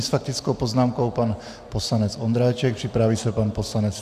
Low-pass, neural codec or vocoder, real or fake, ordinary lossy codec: 14.4 kHz; none; real; AAC, 96 kbps